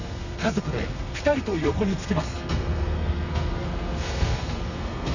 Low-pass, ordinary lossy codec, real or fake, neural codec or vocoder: 7.2 kHz; none; fake; codec, 32 kHz, 1.9 kbps, SNAC